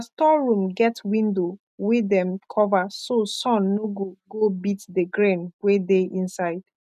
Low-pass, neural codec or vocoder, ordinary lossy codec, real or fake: 14.4 kHz; none; none; real